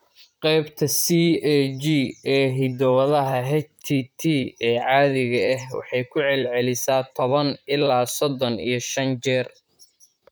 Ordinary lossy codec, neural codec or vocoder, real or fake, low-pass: none; vocoder, 44.1 kHz, 128 mel bands, Pupu-Vocoder; fake; none